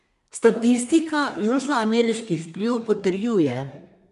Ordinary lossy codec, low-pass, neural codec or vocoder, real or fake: none; 10.8 kHz; codec, 24 kHz, 1 kbps, SNAC; fake